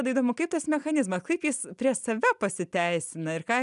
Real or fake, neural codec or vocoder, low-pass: real; none; 10.8 kHz